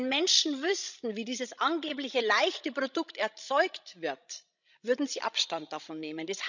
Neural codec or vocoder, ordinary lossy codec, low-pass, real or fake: codec, 16 kHz, 16 kbps, FreqCodec, larger model; none; 7.2 kHz; fake